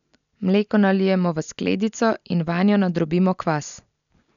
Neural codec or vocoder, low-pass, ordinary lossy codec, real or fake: none; 7.2 kHz; none; real